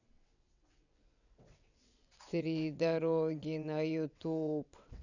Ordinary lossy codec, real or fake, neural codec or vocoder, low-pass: none; fake; vocoder, 22.05 kHz, 80 mel bands, WaveNeXt; 7.2 kHz